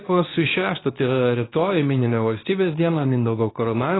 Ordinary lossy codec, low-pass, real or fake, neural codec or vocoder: AAC, 16 kbps; 7.2 kHz; fake; codec, 24 kHz, 0.9 kbps, WavTokenizer, medium speech release version 2